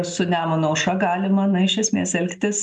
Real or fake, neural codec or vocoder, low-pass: real; none; 10.8 kHz